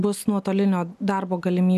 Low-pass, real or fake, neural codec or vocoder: 14.4 kHz; real; none